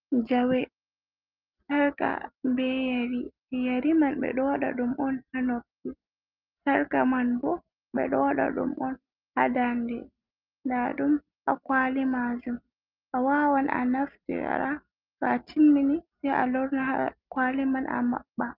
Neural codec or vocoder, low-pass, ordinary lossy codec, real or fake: none; 5.4 kHz; Opus, 24 kbps; real